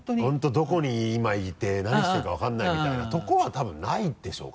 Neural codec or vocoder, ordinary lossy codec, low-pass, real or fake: none; none; none; real